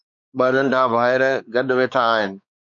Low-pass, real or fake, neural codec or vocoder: 7.2 kHz; fake; codec, 16 kHz, 4 kbps, X-Codec, WavLM features, trained on Multilingual LibriSpeech